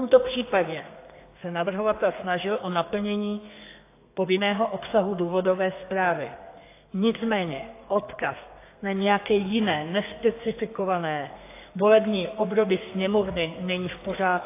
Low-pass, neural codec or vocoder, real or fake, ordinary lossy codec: 3.6 kHz; codec, 32 kHz, 1.9 kbps, SNAC; fake; AAC, 24 kbps